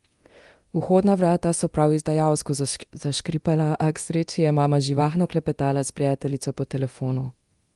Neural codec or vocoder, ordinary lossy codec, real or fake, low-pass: codec, 24 kHz, 0.9 kbps, DualCodec; Opus, 24 kbps; fake; 10.8 kHz